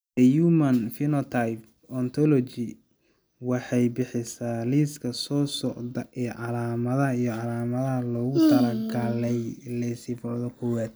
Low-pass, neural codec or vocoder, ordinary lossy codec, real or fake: none; none; none; real